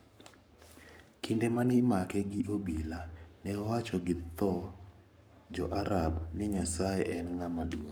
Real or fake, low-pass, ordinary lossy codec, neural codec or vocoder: fake; none; none; codec, 44.1 kHz, 7.8 kbps, Pupu-Codec